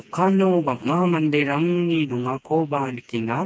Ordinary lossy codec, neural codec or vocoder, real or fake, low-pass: none; codec, 16 kHz, 2 kbps, FreqCodec, smaller model; fake; none